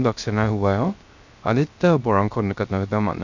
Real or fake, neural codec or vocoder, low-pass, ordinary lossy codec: fake; codec, 16 kHz, 0.3 kbps, FocalCodec; 7.2 kHz; none